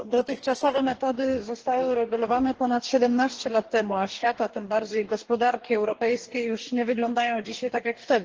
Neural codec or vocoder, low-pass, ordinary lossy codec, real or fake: codec, 44.1 kHz, 2.6 kbps, DAC; 7.2 kHz; Opus, 16 kbps; fake